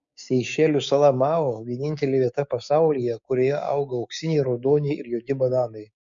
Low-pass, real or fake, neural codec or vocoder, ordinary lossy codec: 7.2 kHz; fake; codec, 16 kHz, 6 kbps, DAC; MP3, 64 kbps